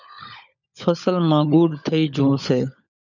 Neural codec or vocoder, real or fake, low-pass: codec, 16 kHz, 16 kbps, FunCodec, trained on LibriTTS, 50 frames a second; fake; 7.2 kHz